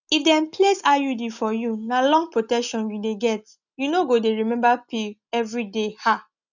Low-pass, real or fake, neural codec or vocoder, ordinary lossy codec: 7.2 kHz; real; none; none